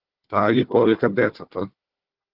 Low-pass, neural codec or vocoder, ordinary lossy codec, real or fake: 5.4 kHz; codec, 16 kHz, 4 kbps, FunCodec, trained on Chinese and English, 50 frames a second; Opus, 16 kbps; fake